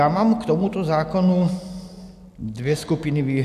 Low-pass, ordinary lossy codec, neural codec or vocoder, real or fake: 14.4 kHz; AAC, 96 kbps; none; real